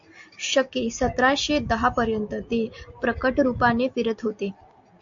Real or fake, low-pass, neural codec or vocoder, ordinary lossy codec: real; 7.2 kHz; none; AAC, 64 kbps